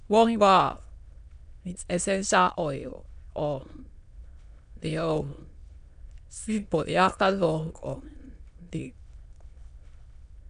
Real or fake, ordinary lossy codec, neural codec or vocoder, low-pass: fake; AAC, 96 kbps; autoencoder, 22.05 kHz, a latent of 192 numbers a frame, VITS, trained on many speakers; 9.9 kHz